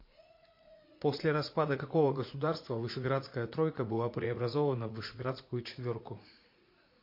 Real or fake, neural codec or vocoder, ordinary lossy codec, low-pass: fake; vocoder, 44.1 kHz, 80 mel bands, Vocos; MP3, 32 kbps; 5.4 kHz